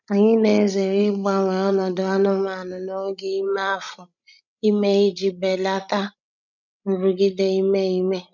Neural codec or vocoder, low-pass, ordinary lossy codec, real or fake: codec, 16 kHz, 16 kbps, FreqCodec, larger model; 7.2 kHz; none; fake